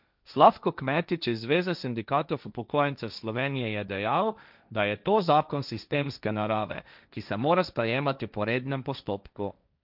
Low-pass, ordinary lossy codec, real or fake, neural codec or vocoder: 5.4 kHz; none; fake; codec, 16 kHz, 1.1 kbps, Voila-Tokenizer